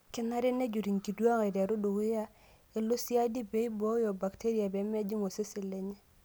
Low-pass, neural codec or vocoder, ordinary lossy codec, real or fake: none; none; none; real